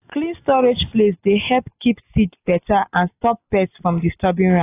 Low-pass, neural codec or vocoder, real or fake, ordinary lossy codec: 3.6 kHz; none; real; AAC, 24 kbps